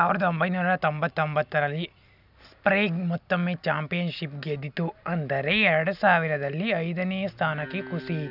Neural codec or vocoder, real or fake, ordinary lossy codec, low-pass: none; real; none; 5.4 kHz